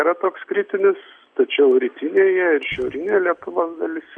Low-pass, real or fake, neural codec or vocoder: 9.9 kHz; real; none